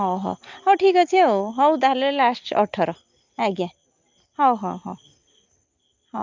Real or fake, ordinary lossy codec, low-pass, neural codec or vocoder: real; Opus, 24 kbps; 7.2 kHz; none